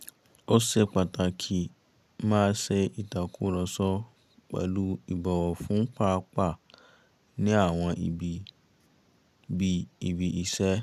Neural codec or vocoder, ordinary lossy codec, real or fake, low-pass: none; none; real; 14.4 kHz